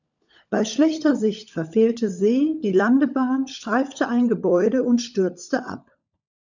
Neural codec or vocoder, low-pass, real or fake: codec, 16 kHz, 16 kbps, FunCodec, trained on LibriTTS, 50 frames a second; 7.2 kHz; fake